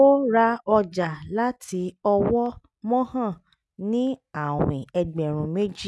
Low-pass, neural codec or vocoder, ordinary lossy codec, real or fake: none; none; none; real